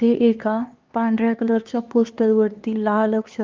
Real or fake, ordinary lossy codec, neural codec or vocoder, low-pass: fake; Opus, 32 kbps; codec, 16 kHz, 2 kbps, X-Codec, HuBERT features, trained on LibriSpeech; 7.2 kHz